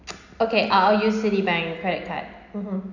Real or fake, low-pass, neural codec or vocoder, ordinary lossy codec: real; 7.2 kHz; none; none